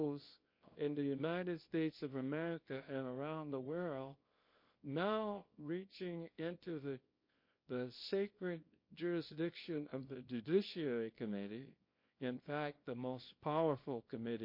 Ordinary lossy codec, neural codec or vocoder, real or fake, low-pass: MP3, 32 kbps; codec, 24 kHz, 0.9 kbps, WavTokenizer, small release; fake; 5.4 kHz